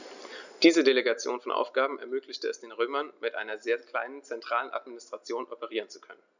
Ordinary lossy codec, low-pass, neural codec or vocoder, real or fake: none; 7.2 kHz; none; real